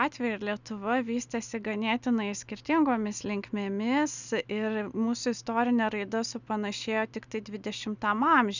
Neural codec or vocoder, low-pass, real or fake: none; 7.2 kHz; real